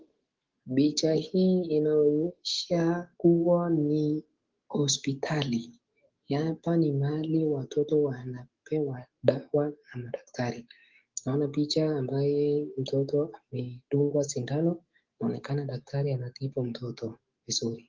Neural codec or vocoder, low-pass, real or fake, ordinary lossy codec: autoencoder, 48 kHz, 128 numbers a frame, DAC-VAE, trained on Japanese speech; 7.2 kHz; fake; Opus, 16 kbps